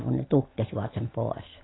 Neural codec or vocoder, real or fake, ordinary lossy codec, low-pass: none; real; AAC, 16 kbps; 7.2 kHz